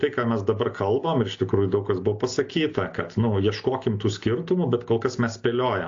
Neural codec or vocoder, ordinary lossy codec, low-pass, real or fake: none; AAC, 48 kbps; 7.2 kHz; real